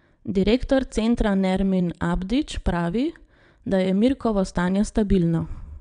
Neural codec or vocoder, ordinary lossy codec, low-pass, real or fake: vocoder, 22.05 kHz, 80 mel bands, WaveNeXt; none; 9.9 kHz; fake